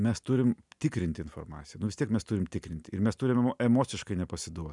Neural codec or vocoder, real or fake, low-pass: none; real; 10.8 kHz